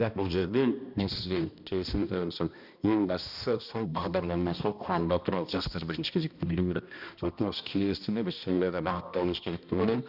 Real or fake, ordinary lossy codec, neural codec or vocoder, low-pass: fake; none; codec, 16 kHz, 1 kbps, X-Codec, HuBERT features, trained on balanced general audio; 5.4 kHz